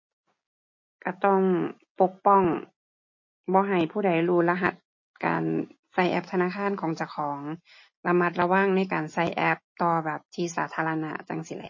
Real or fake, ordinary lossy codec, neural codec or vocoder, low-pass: real; MP3, 32 kbps; none; 7.2 kHz